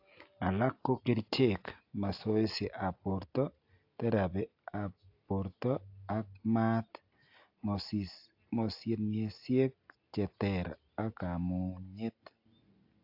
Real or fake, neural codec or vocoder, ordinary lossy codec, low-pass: real; none; AAC, 48 kbps; 5.4 kHz